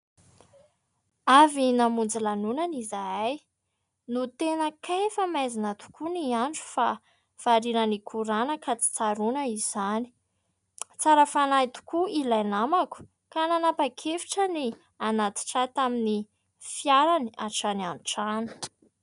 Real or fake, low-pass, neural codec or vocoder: real; 10.8 kHz; none